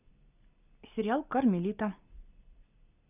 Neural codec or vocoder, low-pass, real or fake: none; 3.6 kHz; real